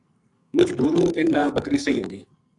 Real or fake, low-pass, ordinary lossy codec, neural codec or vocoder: fake; 10.8 kHz; AAC, 64 kbps; codec, 44.1 kHz, 2.6 kbps, SNAC